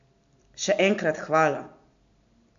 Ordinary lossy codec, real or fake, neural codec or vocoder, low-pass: AAC, 64 kbps; real; none; 7.2 kHz